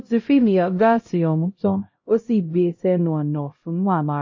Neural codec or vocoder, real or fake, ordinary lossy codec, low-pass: codec, 16 kHz, 0.5 kbps, X-Codec, HuBERT features, trained on LibriSpeech; fake; MP3, 32 kbps; 7.2 kHz